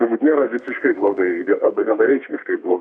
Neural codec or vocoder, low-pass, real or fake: codec, 32 kHz, 1.9 kbps, SNAC; 9.9 kHz; fake